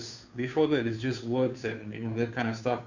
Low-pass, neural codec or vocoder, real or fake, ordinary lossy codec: 7.2 kHz; codec, 16 kHz, 2 kbps, FunCodec, trained on Chinese and English, 25 frames a second; fake; none